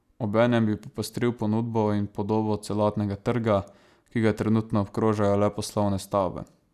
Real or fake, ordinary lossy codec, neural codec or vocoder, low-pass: real; none; none; 14.4 kHz